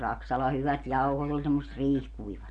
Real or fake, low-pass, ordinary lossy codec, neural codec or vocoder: real; 9.9 kHz; Opus, 64 kbps; none